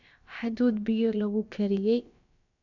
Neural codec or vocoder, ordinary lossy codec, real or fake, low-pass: codec, 16 kHz, about 1 kbps, DyCAST, with the encoder's durations; none; fake; 7.2 kHz